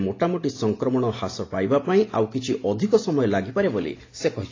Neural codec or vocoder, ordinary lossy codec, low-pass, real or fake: none; AAC, 32 kbps; 7.2 kHz; real